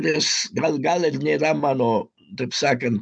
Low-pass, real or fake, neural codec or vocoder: 9.9 kHz; real; none